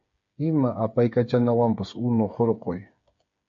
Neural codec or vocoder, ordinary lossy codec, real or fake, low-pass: codec, 16 kHz, 8 kbps, FreqCodec, smaller model; MP3, 48 kbps; fake; 7.2 kHz